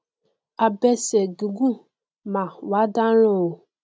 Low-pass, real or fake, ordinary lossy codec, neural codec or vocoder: none; real; none; none